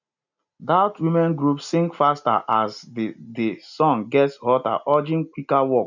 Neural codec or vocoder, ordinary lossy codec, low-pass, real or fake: none; none; 7.2 kHz; real